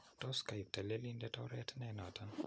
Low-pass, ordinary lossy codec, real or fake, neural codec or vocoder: none; none; real; none